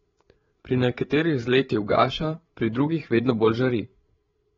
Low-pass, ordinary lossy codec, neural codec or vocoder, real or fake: 7.2 kHz; AAC, 24 kbps; codec, 16 kHz, 8 kbps, FreqCodec, larger model; fake